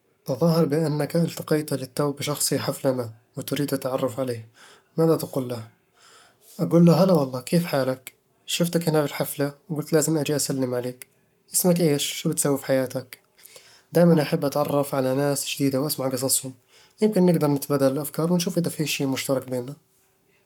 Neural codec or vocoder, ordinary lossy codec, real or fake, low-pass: codec, 44.1 kHz, 7.8 kbps, Pupu-Codec; none; fake; 19.8 kHz